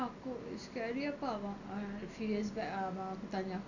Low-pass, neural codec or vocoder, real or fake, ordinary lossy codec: 7.2 kHz; none; real; none